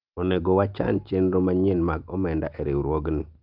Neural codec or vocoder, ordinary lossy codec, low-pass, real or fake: none; Opus, 24 kbps; 5.4 kHz; real